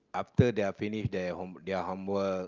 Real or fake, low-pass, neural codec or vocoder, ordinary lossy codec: real; 7.2 kHz; none; Opus, 24 kbps